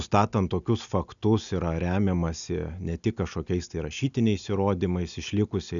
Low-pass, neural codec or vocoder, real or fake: 7.2 kHz; none; real